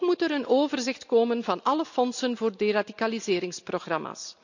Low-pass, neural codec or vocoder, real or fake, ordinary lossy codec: 7.2 kHz; none; real; none